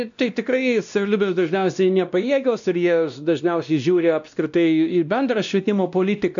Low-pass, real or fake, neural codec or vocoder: 7.2 kHz; fake; codec, 16 kHz, 1 kbps, X-Codec, WavLM features, trained on Multilingual LibriSpeech